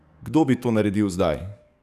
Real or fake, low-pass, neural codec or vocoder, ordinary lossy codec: fake; 14.4 kHz; codec, 44.1 kHz, 7.8 kbps, DAC; none